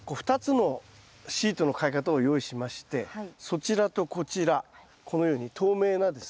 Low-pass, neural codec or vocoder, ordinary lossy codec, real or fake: none; none; none; real